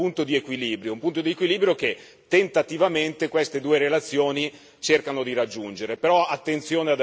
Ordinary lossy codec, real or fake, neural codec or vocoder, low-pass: none; real; none; none